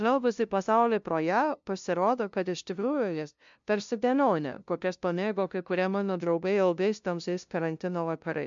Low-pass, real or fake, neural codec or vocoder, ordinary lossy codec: 7.2 kHz; fake; codec, 16 kHz, 0.5 kbps, FunCodec, trained on LibriTTS, 25 frames a second; MP3, 64 kbps